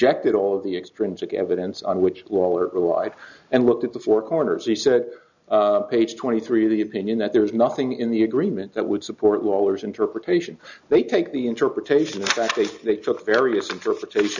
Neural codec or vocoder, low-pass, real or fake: none; 7.2 kHz; real